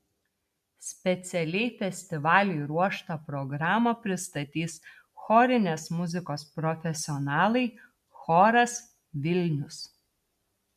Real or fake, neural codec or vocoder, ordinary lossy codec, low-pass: real; none; MP3, 96 kbps; 14.4 kHz